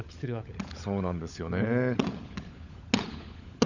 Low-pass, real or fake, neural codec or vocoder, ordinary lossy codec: 7.2 kHz; fake; codec, 16 kHz, 16 kbps, FunCodec, trained on LibriTTS, 50 frames a second; none